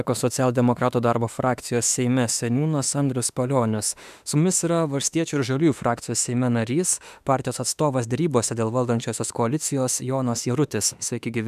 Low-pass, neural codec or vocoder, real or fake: 14.4 kHz; autoencoder, 48 kHz, 32 numbers a frame, DAC-VAE, trained on Japanese speech; fake